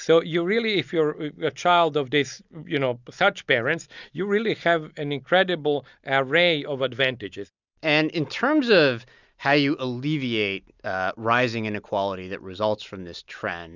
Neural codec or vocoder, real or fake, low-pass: none; real; 7.2 kHz